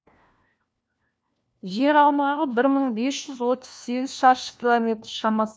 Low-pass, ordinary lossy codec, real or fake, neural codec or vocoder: none; none; fake; codec, 16 kHz, 1 kbps, FunCodec, trained on LibriTTS, 50 frames a second